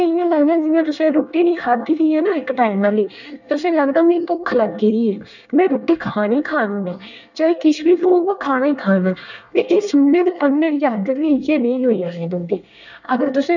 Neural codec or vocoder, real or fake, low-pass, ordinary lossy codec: codec, 24 kHz, 1 kbps, SNAC; fake; 7.2 kHz; none